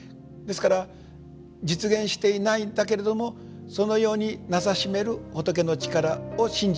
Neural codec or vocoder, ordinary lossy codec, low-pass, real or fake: none; none; none; real